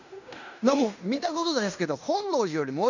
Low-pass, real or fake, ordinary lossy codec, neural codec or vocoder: 7.2 kHz; fake; none; codec, 16 kHz in and 24 kHz out, 0.9 kbps, LongCat-Audio-Codec, fine tuned four codebook decoder